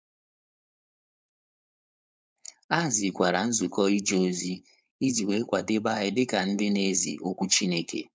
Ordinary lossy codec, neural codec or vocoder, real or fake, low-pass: none; codec, 16 kHz, 4.8 kbps, FACodec; fake; none